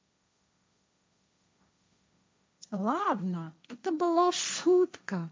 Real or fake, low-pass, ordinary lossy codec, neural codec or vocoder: fake; none; none; codec, 16 kHz, 1.1 kbps, Voila-Tokenizer